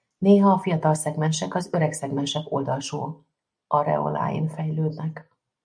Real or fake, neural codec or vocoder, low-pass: real; none; 9.9 kHz